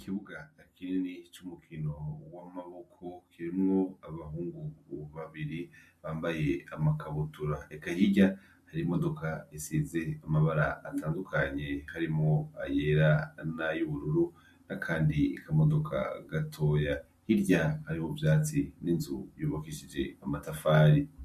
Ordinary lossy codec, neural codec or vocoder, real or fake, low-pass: MP3, 64 kbps; none; real; 14.4 kHz